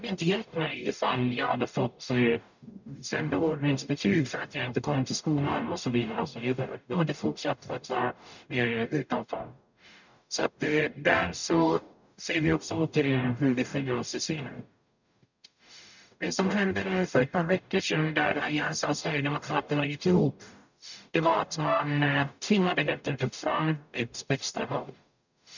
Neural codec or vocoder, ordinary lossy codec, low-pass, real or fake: codec, 44.1 kHz, 0.9 kbps, DAC; none; 7.2 kHz; fake